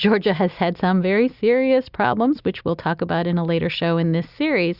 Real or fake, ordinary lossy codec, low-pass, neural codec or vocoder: real; Opus, 64 kbps; 5.4 kHz; none